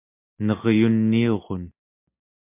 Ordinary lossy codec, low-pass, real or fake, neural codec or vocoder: MP3, 32 kbps; 3.6 kHz; real; none